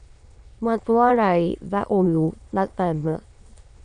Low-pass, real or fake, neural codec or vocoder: 9.9 kHz; fake; autoencoder, 22.05 kHz, a latent of 192 numbers a frame, VITS, trained on many speakers